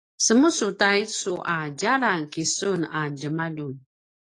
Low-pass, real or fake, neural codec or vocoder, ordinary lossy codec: 10.8 kHz; fake; codec, 44.1 kHz, 7.8 kbps, DAC; AAC, 32 kbps